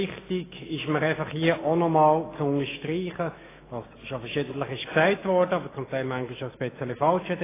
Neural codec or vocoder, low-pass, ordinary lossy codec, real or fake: autoencoder, 48 kHz, 128 numbers a frame, DAC-VAE, trained on Japanese speech; 3.6 kHz; AAC, 16 kbps; fake